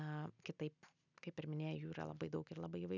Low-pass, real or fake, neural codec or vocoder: 7.2 kHz; real; none